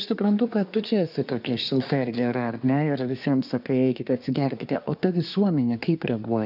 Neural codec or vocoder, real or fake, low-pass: codec, 24 kHz, 1 kbps, SNAC; fake; 5.4 kHz